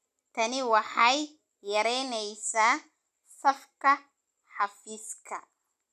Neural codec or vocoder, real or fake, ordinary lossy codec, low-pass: none; real; none; 14.4 kHz